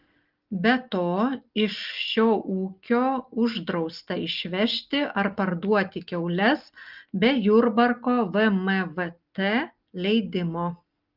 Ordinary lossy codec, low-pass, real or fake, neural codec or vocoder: Opus, 32 kbps; 5.4 kHz; real; none